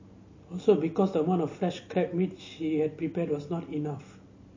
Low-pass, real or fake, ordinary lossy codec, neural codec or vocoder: 7.2 kHz; real; MP3, 32 kbps; none